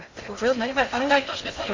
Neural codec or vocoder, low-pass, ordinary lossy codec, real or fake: codec, 16 kHz in and 24 kHz out, 0.6 kbps, FocalCodec, streaming, 2048 codes; 7.2 kHz; MP3, 64 kbps; fake